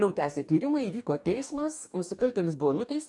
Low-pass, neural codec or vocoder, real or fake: 10.8 kHz; codec, 44.1 kHz, 2.6 kbps, DAC; fake